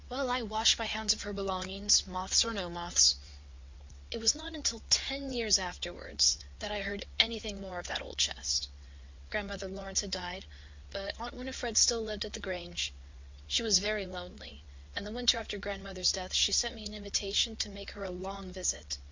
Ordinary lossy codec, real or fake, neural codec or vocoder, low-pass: MP3, 64 kbps; fake; vocoder, 22.05 kHz, 80 mel bands, WaveNeXt; 7.2 kHz